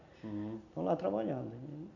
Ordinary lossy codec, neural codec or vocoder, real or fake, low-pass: none; none; real; 7.2 kHz